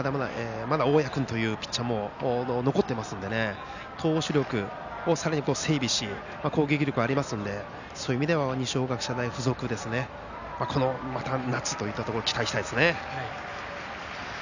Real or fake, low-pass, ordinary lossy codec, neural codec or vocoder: real; 7.2 kHz; none; none